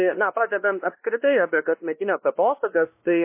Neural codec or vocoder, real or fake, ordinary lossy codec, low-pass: codec, 16 kHz, 1 kbps, X-Codec, WavLM features, trained on Multilingual LibriSpeech; fake; MP3, 24 kbps; 3.6 kHz